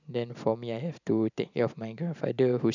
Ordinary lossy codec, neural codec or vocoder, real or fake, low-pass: none; vocoder, 44.1 kHz, 128 mel bands every 512 samples, BigVGAN v2; fake; 7.2 kHz